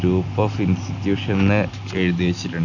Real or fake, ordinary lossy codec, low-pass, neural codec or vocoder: real; none; 7.2 kHz; none